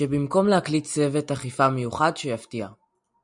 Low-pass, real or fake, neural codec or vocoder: 10.8 kHz; real; none